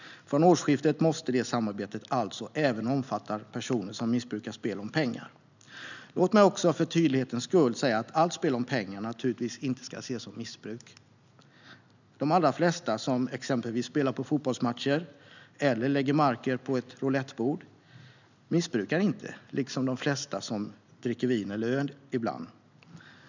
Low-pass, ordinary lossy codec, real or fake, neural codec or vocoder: 7.2 kHz; none; real; none